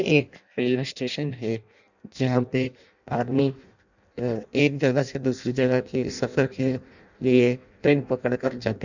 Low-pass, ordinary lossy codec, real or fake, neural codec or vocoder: 7.2 kHz; none; fake; codec, 16 kHz in and 24 kHz out, 0.6 kbps, FireRedTTS-2 codec